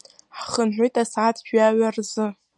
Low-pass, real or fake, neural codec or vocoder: 10.8 kHz; real; none